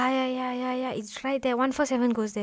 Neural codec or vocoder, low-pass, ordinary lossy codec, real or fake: none; none; none; real